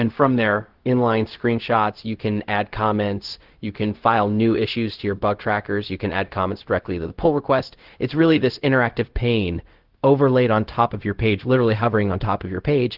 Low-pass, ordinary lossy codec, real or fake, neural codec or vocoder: 5.4 kHz; Opus, 16 kbps; fake; codec, 16 kHz, 0.4 kbps, LongCat-Audio-Codec